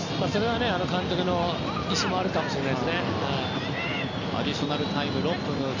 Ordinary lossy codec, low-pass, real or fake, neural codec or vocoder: none; 7.2 kHz; real; none